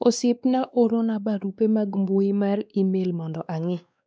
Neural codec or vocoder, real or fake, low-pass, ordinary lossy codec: codec, 16 kHz, 2 kbps, X-Codec, WavLM features, trained on Multilingual LibriSpeech; fake; none; none